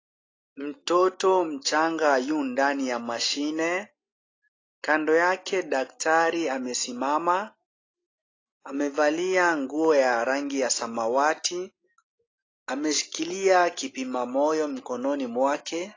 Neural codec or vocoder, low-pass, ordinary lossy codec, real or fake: none; 7.2 kHz; AAC, 32 kbps; real